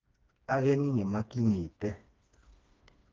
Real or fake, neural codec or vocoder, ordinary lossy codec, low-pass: fake; codec, 16 kHz, 2 kbps, FreqCodec, smaller model; Opus, 24 kbps; 7.2 kHz